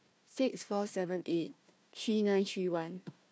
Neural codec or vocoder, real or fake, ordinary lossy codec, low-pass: codec, 16 kHz, 1 kbps, FunCodec, trained on Chinese and English, 50 frames a second; fake; none; none